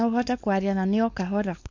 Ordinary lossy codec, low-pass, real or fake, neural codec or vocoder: MP3, 48 kbps; 7.2 kHz; fake; codec, 16 kHz, 4.8 kbps, FACodec